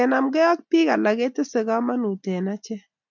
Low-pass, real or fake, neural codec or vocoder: 7.2 kHz; real; none